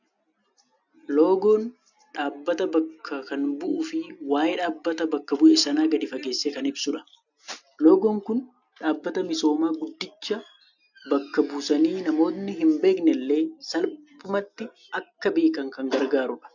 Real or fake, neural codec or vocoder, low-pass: real; none; 7.2 kHz